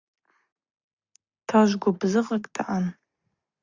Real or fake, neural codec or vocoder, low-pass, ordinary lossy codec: real; none; 7.2 kHz; Opus, 64 kbps